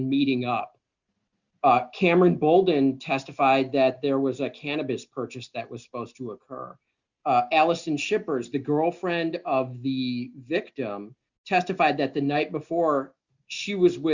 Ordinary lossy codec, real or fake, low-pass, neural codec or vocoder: Opus, 64 kbps; real; 7.2 kHz; none